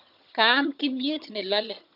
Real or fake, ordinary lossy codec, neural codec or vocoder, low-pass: fake; none; vocoder, 22.05 kHz, 80 mel bands, HiFi-GAN; 5.4 kHz